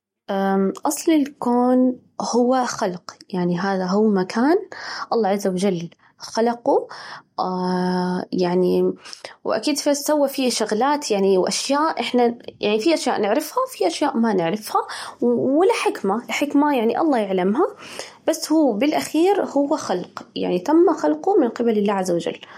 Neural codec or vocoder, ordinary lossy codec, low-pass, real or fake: none; MP3, 64 kbps; 19.8 kHz; real